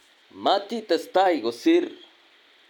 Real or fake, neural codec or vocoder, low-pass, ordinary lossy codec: real; none; 19.8 kHz; none